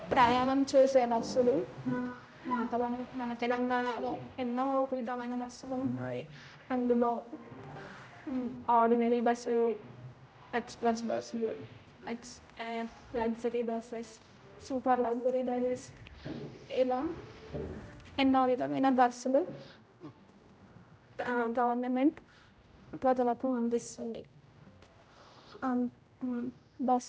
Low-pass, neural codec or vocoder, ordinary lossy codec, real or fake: none; codec, 16 kHz, 0.5 kbps, X-Codec, HuBERT features, trained on general audio; none; fake